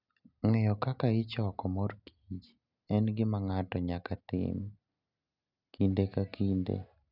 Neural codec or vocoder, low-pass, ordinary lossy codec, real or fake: none; 5.4 kHz; none; real